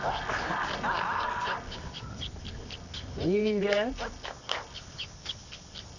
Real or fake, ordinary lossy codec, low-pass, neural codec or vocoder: fake; none; 7.2 kHz; codec, 24 kHz, 0.9 kbps, WavTokenizer, medium music audio release